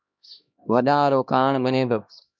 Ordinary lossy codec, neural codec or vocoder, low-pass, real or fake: MP3, 64 kbps; codec, 16 kHz, 1 kbps, X-Codec, HuBERT features, trained on LibriSpeech; 7.2 kHz; fake